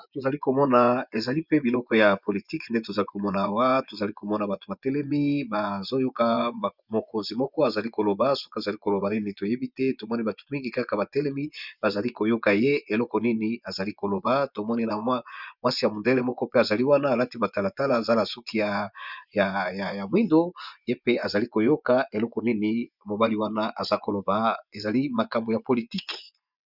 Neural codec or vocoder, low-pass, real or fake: vocoder, 24 kHz, 100 mel bands, Vocos; 5.4 kHz; fake